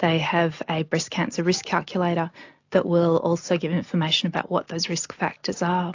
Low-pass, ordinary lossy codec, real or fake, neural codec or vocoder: 7.2 kHz; AAC, 48 kbps; real; none